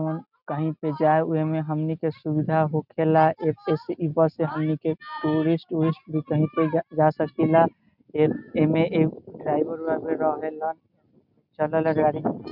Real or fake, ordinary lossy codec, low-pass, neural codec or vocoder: real; none; 5.4 kHz; none